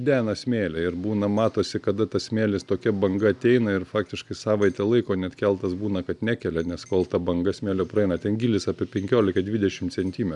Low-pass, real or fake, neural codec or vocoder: 10.8 kHz; real; none